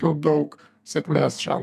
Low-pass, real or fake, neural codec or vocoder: 14.4 kHz; fake; codec, 32 kHz, 1.9 kbps, SNAC